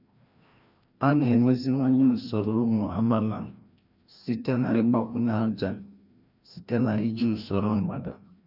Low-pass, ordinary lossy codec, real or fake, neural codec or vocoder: 5.4 kHz; none; fake; codec, 16 kHz, 1 kbps, FreqCodec, larger model